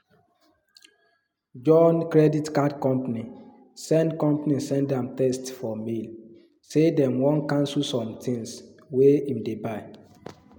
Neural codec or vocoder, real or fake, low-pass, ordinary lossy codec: none; real; 19.8 kHz; MP3, 96 kbps